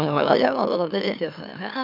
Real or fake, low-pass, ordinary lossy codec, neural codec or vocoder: fake; 5.4 kHz; none; autoencoder, 44.1 kHz, a latent of 192 numbers a frame, MeloTTS